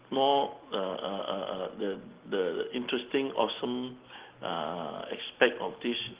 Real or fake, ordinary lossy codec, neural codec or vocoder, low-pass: real; Opus, 16 kbps; none; 3.6 kHz